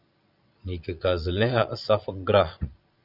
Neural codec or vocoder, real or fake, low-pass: vocoder, 22.05 kHz, 80 mel bands, Vocos; fake; 5.4 kHz